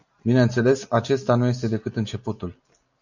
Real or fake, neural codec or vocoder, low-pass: real; none; 7.2 kHz